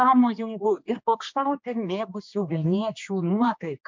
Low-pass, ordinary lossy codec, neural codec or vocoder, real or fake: 7.2 kHz; MP3, 64 kbps; codec, 16 kHz, 2 kbps, X-Codec, HuBERT features, trained on general audio; fake